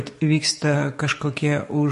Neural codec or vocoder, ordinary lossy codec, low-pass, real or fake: codec, 44.1 kHz, 7.8 kbps, DAC; MP3, 48 kbps; 14.4 kHz; fake